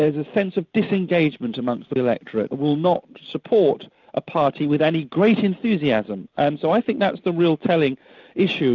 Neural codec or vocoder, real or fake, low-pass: none; real; 7.2 kHz